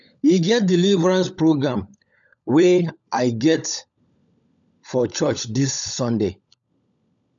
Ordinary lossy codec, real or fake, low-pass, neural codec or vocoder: none; fake; 7.2 kHz; codec, 16 kHz, 16 kbps, FunCodec, trained on LibriTTS, 50 frames a second